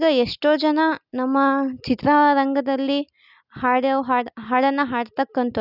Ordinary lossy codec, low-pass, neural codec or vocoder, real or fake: none; 5.4 kHz; none; real